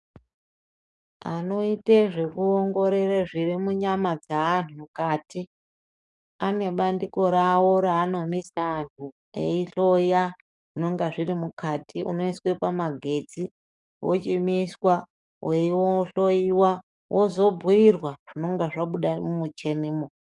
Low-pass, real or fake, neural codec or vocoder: 10.8 kHz; fake; codec, 44.1 kHz, 7.8 kbps, DAC